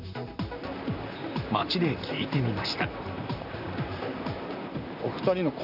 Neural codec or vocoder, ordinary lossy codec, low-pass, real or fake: vocoder, 44.1 kHz, 128 mel bands, Pupu-Vocoder; none; 5.4 kHz; fake